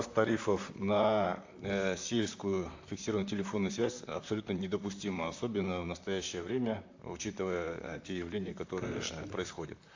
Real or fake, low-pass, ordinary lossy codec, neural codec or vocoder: fake; 7.2 kHz; AAC, 48 kbps; vocoder, 44.1 kHz, 128 mel bands, Pupu-Vocoder